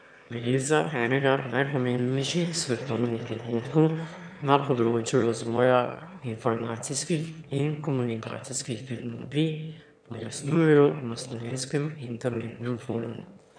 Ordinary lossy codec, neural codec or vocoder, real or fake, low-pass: none; autoencoder, 22.05 kHz, a latent of 192 numbers a frame, VITS, trained on one speaker; fake; 9.9 kHz